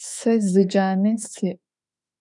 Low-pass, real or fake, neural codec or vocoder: 10.8 kHz; fake; autoencoder, 48 kHz, 32 numbers a frame, DAC-VAE, trained on Japanese speech